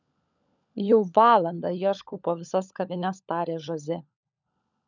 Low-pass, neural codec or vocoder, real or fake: 7.2 kHz; codec, 16 kHz, 16 kbps, FunCodec, trained on LibriTTS, 50 frames a second; fake